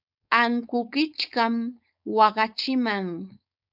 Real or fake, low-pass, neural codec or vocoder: fake; 5.4 kHz; codec, 16 kHz, 4.8 kbps, FACodec